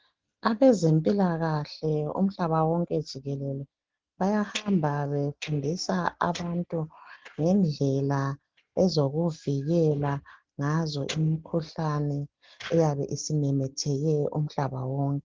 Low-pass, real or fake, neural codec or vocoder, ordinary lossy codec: 7.2 kHz; real; none; Opus, 16 kbps